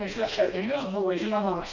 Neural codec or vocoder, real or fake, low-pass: codec, 16 kHz, 1 kbps, FreqCodec, smaller model; fake; 7.2 kHz